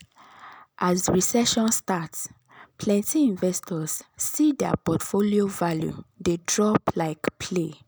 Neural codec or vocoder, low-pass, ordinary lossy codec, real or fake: none; none; none; real